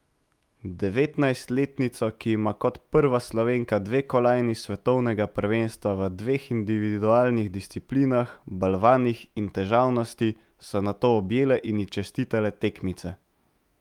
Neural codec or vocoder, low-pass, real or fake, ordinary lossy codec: autoencoder, 48 kHz, 128 numbers a frame, DAC-VAE, trained on Japanese speech; 19.8 kHz; fake; Opus, 32 kbps